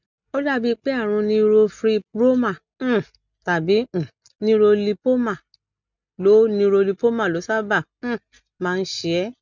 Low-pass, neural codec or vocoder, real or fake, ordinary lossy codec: 7.2 kHz; none; real; none